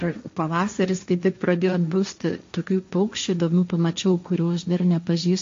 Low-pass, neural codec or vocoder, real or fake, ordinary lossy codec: 7.2 kHz; codec, 16 kHz, 1.1 kbps, Voila-Tokenizer; fake; MP3, 48 kbps